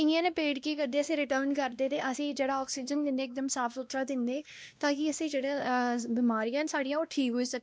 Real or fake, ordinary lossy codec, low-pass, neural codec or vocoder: fake; none; none; codec, 16 kHz, 1 kbps, X-Codec, WavLM features, trained on Multilingual LibriSpeech